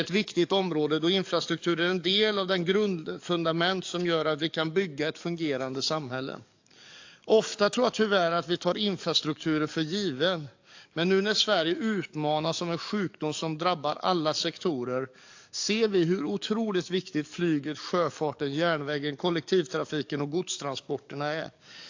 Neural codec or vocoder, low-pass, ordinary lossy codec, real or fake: codec, 44.1 kHz, 7.8 kbps, DAC; 7.2 kHz; AAC, 48 kbps; fake